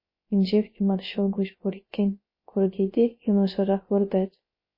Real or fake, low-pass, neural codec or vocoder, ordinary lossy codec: fake; 5.4 kHz; codec, 16 kHz, 0.3 kbps, FocalCodec; MP3, 24 kbps